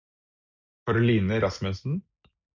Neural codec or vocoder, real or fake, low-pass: none; real; 7.2 kHz